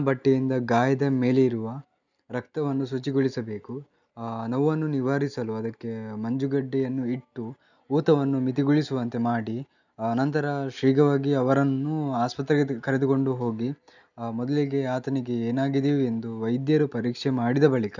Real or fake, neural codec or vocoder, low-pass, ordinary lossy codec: real; none; 7.2 kHz; none